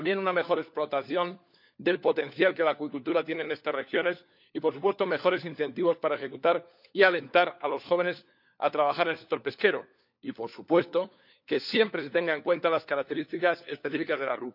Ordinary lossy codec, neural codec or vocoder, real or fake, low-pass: none; codec, 16 kHz, 4 kbps, FunCodec, trained on LibriTTS, 50 frames a second; fake; 5.4 kHz